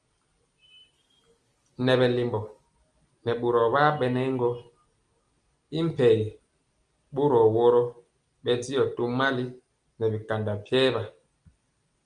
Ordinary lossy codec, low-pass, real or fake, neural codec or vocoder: Opus, 32 kbps; 9.9 kHz; real; none